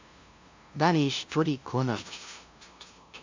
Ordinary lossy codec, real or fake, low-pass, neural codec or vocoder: MP3, 64 kbps; fake; 7.2 kHz; codec, 16 kHz, 0.5 kbps, FunCodec, trained on LibriTTS, 25 frames a second